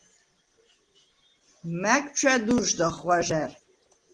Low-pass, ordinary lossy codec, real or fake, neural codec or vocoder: 9.9 kHz; Opus, 16 kbps; real; none